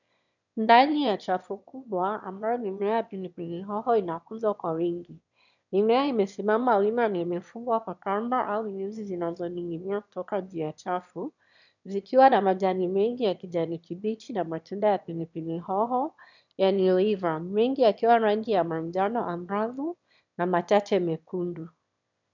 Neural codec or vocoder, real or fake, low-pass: autoencoder, 22.05 kHz, a latent of 192 numbers a frame, VITS, trained on one speaker; fake; 7.2 kHz